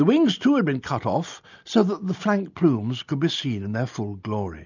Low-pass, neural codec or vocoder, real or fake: 7.2 kHz; none; real